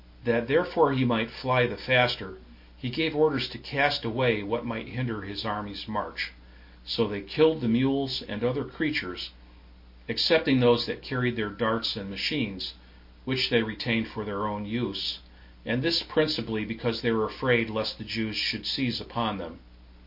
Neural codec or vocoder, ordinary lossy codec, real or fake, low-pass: none; MP3, 32 kbps; real; 5.4 kHz